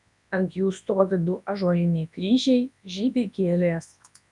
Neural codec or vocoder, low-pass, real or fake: codec, 24 kHz, 0.9 kbps, WavTokenizer, large speech release; 10.8 kHz; fake